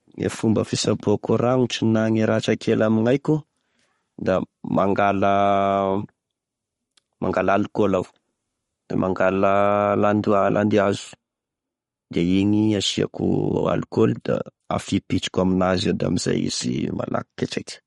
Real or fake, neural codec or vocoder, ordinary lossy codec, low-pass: fake; codec, 44.1 kHz, 7.8 kbps, Pupu-Codec; MP3, 48 kbps; 19.8 kHz